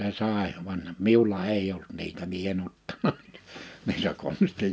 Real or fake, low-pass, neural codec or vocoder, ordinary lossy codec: real; none; none; none